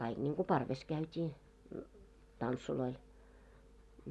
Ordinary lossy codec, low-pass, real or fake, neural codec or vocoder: none; none; real; none